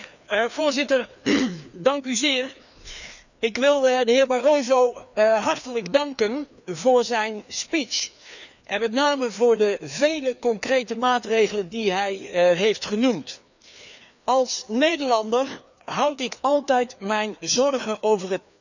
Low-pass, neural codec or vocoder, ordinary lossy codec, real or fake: 7.2 kHz; codec, 16 kHz, 2 kbps, FreqCodec, larger model; none; fake